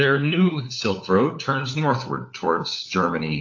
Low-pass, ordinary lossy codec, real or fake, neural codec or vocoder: 7.2 kHz; AAC, 48 kbps; fake; codec, 16 kHz, 4 kbps, FunCodec, trained on LibriTTS, 50 frames a second